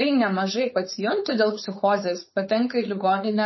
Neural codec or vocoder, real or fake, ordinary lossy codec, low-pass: codec, 16 kHz, 4.8 kbps, FACodec; fake; MP3, 24 kbps; 7.2 kHz